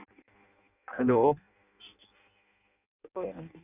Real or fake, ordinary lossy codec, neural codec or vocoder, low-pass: fake; none; codec, 16 kHz in and 24 kHz out, 0.6 kbps, FireRedTTS-2 codec; 3.6 kHz